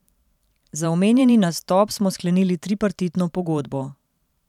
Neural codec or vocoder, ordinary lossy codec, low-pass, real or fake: vocoder, 44.1 kHz, 128 mel bands every 512 samples, BigVGAN v2; none; 19.8 kHz; fake